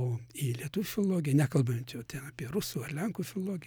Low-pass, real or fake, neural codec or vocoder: 19.8 kHz; real; none